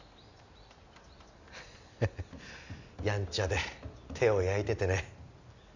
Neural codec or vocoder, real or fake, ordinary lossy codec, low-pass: none; real; none; 7.2 kHz